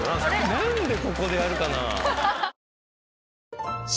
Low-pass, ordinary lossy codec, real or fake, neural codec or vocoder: none; none; real; none